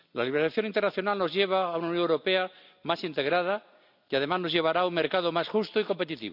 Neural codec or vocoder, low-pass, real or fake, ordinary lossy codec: none; 5.4 kHz; real; none